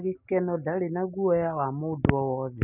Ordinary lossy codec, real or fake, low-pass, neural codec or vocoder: none; real; 3.6 kHz; none